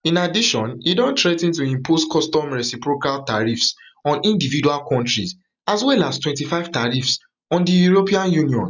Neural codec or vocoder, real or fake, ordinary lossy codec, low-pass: none; real; none; 7.2 kHz